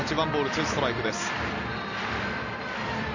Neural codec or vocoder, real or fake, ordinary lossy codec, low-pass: none; real; none; 7.2 kHz